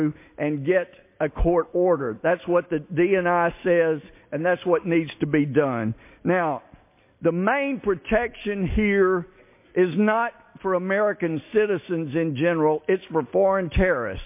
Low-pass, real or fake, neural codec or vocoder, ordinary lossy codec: 3.6 kHz; real; none; MP3, 24 kbps